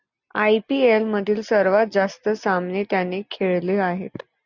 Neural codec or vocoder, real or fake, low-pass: none; real; 7.2 kHz